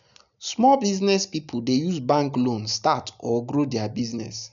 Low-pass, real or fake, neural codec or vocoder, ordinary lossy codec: 7.2 kHz; real; none; none